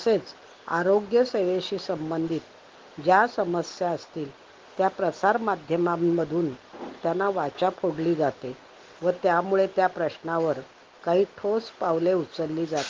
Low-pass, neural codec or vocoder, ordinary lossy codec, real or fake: 7.2 kHz; none; Opus, 16 kbps; real